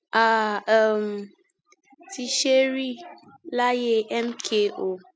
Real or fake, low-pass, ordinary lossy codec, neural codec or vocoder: real; none; none; none